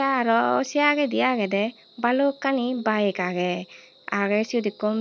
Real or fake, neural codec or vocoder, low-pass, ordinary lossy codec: real; none; none; none